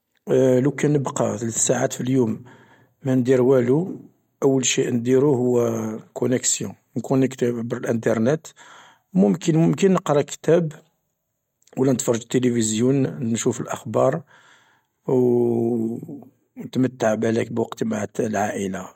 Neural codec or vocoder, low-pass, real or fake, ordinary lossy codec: none; 19.8 kHz; real; MP3, 64 kbps